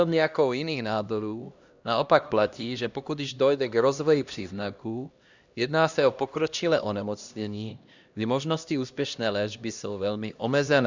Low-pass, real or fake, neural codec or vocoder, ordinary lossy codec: 7.2 kHz; fake; codec, 16 kHz, 1 kbps, X-Codec, HuBERT features, trained on LibriSpeech; Opus, 64 kbps